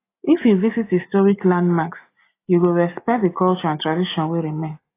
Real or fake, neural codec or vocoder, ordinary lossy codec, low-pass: real; none; AAC, 24 kbps; 3.6 kHz